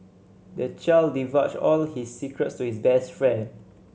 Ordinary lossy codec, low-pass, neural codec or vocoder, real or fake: none; none; none; real